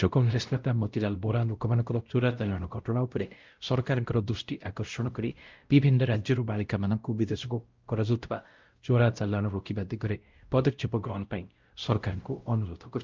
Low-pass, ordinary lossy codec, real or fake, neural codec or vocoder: 7.2 kHz; Opus, 24 kbps; fake; codec, 16 kHz, 0.5 kbps, X-Codec, WavLM features, trained on Multilingual LibriSpeech